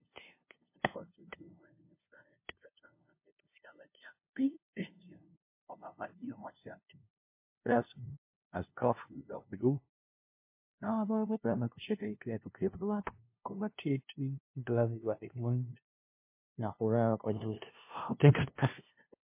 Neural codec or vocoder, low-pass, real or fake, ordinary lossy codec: codec, 16 kHz, 0.5 kbps, FunCodec, trained on LibriTTS, 25 frames a second; 3.6 kHz; fake; MP3, 24 kbps